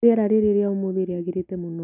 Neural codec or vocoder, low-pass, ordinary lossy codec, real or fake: none; 3.6 kHz; none; real